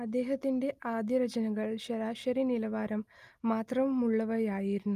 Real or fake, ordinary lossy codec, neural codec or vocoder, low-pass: real; Opus, 32 kbps; none; 14.4 kHz